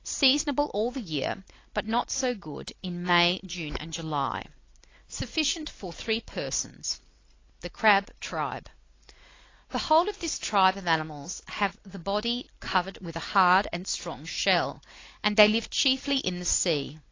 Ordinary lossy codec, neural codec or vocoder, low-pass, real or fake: AAC, 32 kbps; none; 7.2 kHz; real